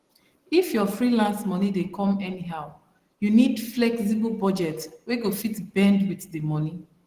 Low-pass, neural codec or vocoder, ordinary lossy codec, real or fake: 14.4 kHz; none; Opus, 16 kbps; real